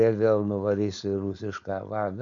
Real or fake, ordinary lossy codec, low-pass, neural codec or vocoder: fake; AAC, 64 kbps; 7.2 kHz; codec, 16 kHz, 4.8 kbps, FACodec